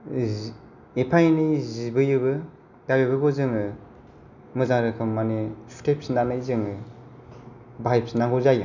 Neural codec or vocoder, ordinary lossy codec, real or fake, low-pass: none; none; real; 7.2 kHz